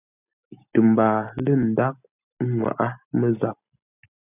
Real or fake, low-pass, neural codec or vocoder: real; 3.6 kHz; none